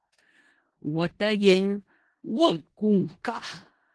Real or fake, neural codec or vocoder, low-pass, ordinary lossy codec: fake; codec, 16 kHz in and 24 kHz out, 0.4 kbps, LongCat-Audio-Codec, four codebook decoder; 10.8 kHz; Opus, 16 kbps